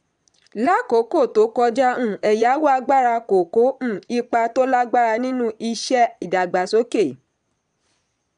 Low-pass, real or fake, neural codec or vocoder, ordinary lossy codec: 9.9 kHz; fake; vocoder, 22.05 kHz, 80 mel bands, Vocos; none